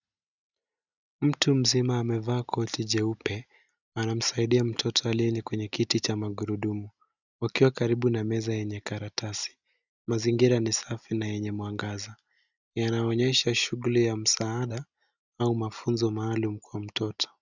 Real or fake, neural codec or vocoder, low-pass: real; none; 7.2 kHz